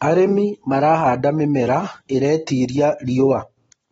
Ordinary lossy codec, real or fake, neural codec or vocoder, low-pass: AAC, 24 kbps; real; none; 14.4 kHz